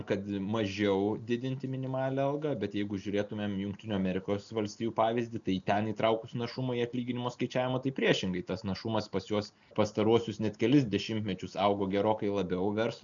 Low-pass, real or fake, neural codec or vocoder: 7.2 kHz; real; none